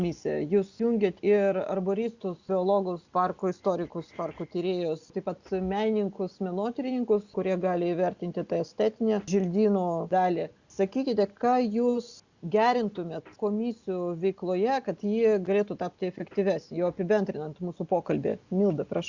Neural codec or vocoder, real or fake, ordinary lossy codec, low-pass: none; real; Opus, 64 kbps; 7.2 kHz